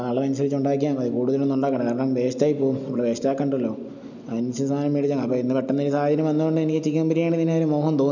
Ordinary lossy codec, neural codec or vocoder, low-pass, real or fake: none; none; 7.2 kHz; real